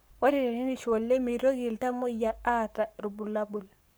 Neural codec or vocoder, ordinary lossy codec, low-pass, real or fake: codec, 44.1 kHz, 7.8 kbps, Pupu-Codec; none; none; fake